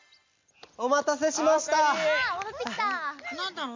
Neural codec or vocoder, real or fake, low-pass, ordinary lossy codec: none; real; 7.2 kHz; MP3, 48 kbps